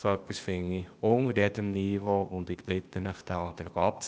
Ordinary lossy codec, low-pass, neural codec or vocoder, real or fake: none; none; codec, 16 kHz, 0.8 kbps, ZipCodec; fake